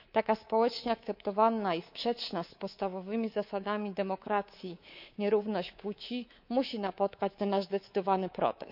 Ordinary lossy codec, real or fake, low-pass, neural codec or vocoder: none; fake; 5.4 kHz; codec, 24 kHz, 3.1 kbps, DualCodec